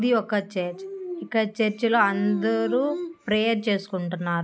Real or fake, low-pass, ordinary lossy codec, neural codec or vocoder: real; none; none; none